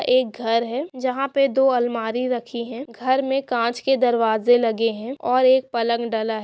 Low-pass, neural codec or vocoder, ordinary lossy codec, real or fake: none; none; none; real